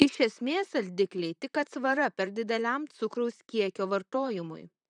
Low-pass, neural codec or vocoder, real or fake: 10.8 kHz; vocoder, 44.1 kHz, 128 mel bands, Pupu-Vocoder; fake